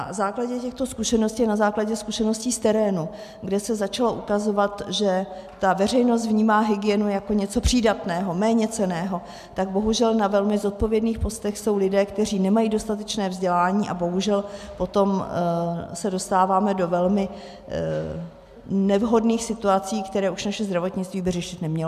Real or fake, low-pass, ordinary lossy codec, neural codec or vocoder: real; 14.4 kHz; MP3, 96 kbps; none